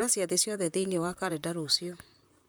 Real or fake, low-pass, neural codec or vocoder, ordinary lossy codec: fake; none; vocoder, 44.1 kHz, 128 mel bands, Pupu-Vocoder; none